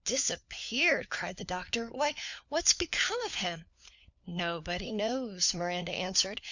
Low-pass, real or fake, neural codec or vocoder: 7.2 kHz; fake; codec, 16 kHz, 4 kbps, FunCodec, trained on LibriTTS, 50 frames a second